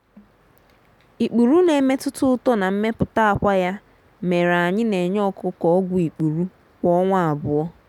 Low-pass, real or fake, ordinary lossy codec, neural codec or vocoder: 19.8 kHz; real; none; none